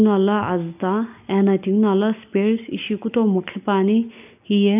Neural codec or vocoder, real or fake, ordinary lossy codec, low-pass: none; real; none; 3.6 kHz